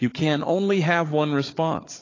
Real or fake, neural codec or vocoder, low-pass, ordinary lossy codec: fake; codec, 16 kHz, 4 kbps, FunCodec, trained on Chinese and English, 50 frames a second; 7.2 kHz; AAC, 32 kbps